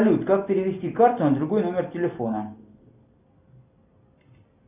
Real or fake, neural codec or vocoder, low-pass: real; none; 3.6 kHz